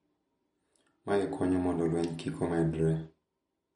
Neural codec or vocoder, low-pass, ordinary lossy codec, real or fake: none; 19.8 kHz; MP3, 48 kbps; real